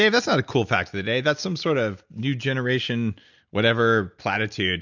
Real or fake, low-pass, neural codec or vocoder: real; 7.2 kHz; none